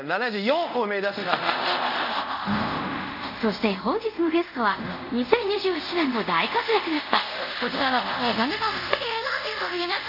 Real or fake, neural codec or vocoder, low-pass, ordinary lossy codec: fake; codec, 24 kHz, 0.5 kbps, DualCodec; 5.4 kHz; none